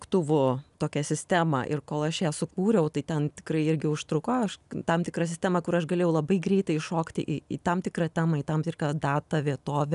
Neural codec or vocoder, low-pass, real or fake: none; 10.8 kHz; real